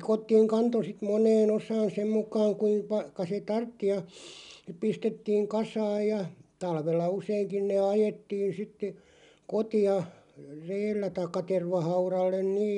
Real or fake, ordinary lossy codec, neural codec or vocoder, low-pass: real; MP3, 96 kbps; none; 10.8 kHz